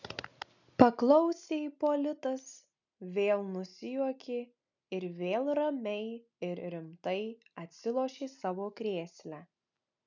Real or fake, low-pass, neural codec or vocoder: real; 7.2 kHz; none